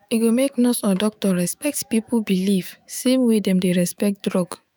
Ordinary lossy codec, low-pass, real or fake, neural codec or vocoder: none; none; fake; autoencoder, 48 kHz, 128 numbers a frame, DAC-VAE, trained on Japanese speech